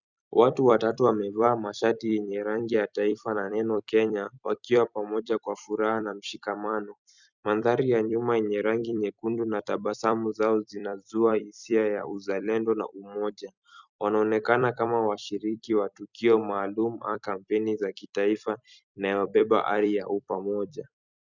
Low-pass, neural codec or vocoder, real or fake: 7.2 kHz; vocoder, 44.1 kHz, 128 mel bands every 256 samples, BigVGAN v2; fake